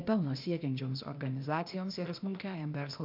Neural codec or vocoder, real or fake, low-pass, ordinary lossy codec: codec, 16 kHz, 0.8 kbps, ZipCodec; fake; 5.4 kHz; MP3, 48 kbps